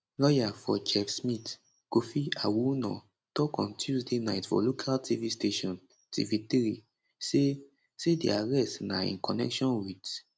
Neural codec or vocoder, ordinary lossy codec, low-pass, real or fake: none; none; none; real